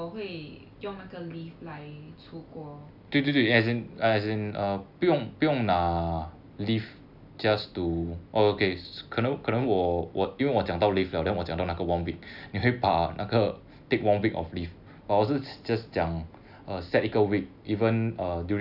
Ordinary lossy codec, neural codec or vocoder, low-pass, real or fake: none; none; 5.4 kHz; real